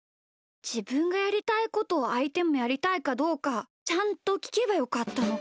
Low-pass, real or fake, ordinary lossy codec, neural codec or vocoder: none; real; none; none